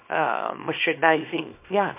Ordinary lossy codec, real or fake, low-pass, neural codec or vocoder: MP3, 32 kbps; fake; 3.6 kHz; codec, 24 kHz, 0.9 kbps, WavTokenizer, small release